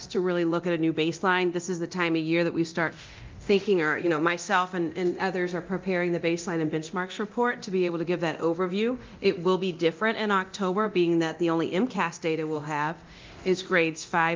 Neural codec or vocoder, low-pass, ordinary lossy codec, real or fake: codec, 24 kHz, 0.9 kbps, DualCodec; 7.2 kHz; Opus, 24 kbps; fake